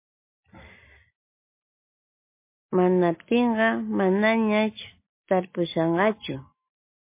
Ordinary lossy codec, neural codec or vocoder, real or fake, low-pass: MP3, 24 kbps; none; real; 3.6 kHz